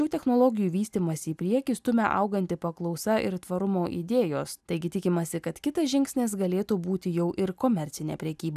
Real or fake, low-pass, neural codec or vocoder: real; 14.4 kHz; none